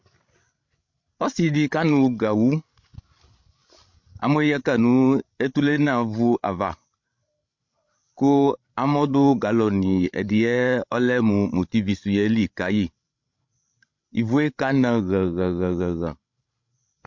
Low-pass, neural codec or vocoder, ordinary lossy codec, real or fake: 7.2 kHz; codec, 16 kHz, 16 kbps, FreqCodec, larger model; MP3, 48 kbps; fake